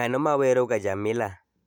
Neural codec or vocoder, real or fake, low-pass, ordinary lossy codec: none; real; 19.8 kHz; none